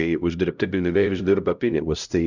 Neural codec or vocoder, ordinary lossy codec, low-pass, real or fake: codec, 16 kHz, 0.5 kbps, X-Codec, HuBERT features, trained on LibriSpeech; Opus, 64 kbps; 7.2 kHz; fake